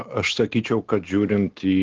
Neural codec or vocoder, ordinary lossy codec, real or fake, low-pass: none; Opus, 16 kbps; real; 7.2 kHz